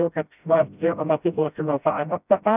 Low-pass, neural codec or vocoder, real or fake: 3.6 kHz; codec, 16 kHz, 0.5 kbps, FreqCodec, smaller model; fake